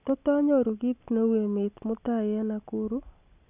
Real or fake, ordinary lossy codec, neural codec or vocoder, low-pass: real; none; none; 3.6 kHz